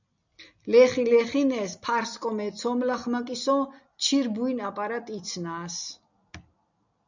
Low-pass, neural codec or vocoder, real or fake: 7.2 kHz; none; real